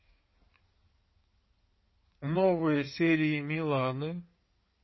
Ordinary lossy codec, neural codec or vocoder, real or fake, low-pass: MP3, 24 kbps; codec, 16 kHz in and 24 kHz out, 2.2 kbps, FireRedTTS-2 codec; fake; 7.2 kHz